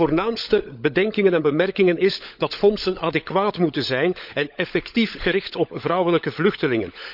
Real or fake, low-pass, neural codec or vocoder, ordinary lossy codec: fake; 5.4 kHz; codec, 16 kHz, 16 kbps, FunCodec, trained on LibriTTS, 50 frames a second; none